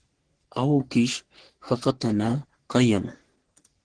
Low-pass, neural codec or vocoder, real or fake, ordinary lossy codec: 9.9 kHz; codec, 44.1 kHz, 3.4 kbps, Pupu-Codec; fake; Opus, 16 kbps